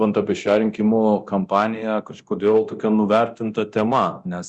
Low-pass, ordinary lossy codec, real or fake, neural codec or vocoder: 10.8 kHz; Opus, 64 kbps; fake; codec, 24 kHz, 0.9 kbps, DualCodec